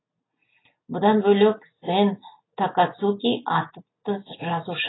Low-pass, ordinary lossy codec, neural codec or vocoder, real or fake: 7.2 kHz; AAC, 16 kbps; none; real